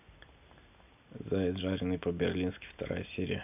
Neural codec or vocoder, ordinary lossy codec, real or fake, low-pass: none; none; real; 3.6 kHz